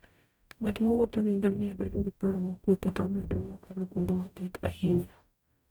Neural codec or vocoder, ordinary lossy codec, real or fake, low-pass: codec, 44.1 kHz, 0.9 kbps, DAC; none; fake; none